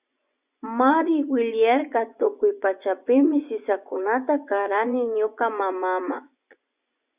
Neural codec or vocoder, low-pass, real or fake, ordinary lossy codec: vocoder, 24 kHz, 100 mel bands, Vocos; 3.6 kHz; fake; Opus, 64 kbps